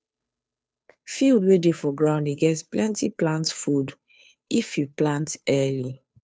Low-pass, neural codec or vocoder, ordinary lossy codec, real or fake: none; codec, 16 kHz, 2 kbps, FunCodec, trained on Chinese and English, 25 frames a second; none; fake